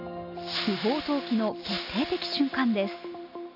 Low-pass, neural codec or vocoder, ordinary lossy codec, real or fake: 5.4 kHz; none; none; real